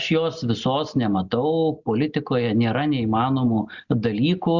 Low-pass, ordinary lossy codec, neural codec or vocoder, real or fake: 7.2 kHz; Opus, 64 kbps; none; real